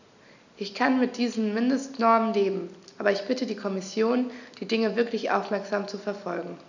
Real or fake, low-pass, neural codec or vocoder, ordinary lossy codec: real; 7.2 kHz; none; none